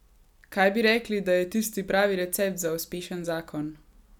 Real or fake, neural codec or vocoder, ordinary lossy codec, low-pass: real; none; none; 19.8 kHz